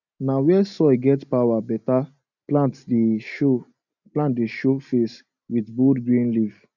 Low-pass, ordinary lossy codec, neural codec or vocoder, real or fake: 7.2 kHz; none; none; real